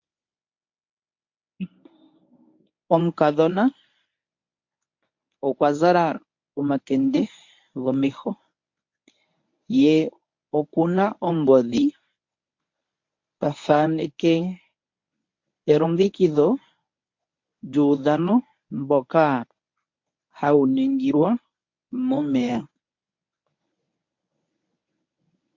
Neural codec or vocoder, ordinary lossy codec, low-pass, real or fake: codec, 24 kHz, 0.9 kbps, WavTokenizer, medium speech release version 1; MP3, 48 kbps; 7.2 kHz; fake